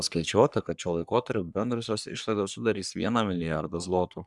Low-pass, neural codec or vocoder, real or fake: 10.8 kHz; codec, 44.1 kHz, 3.4 kbps, Pupu-Codec; fake